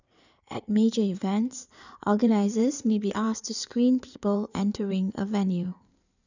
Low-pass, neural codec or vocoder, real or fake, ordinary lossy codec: 7.2 kHz; codec, 16 kHz in and 24 kHz out, 2.2 kbps, FireRedTTS-2 codec; fake; none